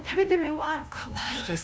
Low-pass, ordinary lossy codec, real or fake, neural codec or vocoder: none; none; fake; codec, 16 kHz, 0.5 kbps, FunCodec, trained on LibriTTS, 25 frames a second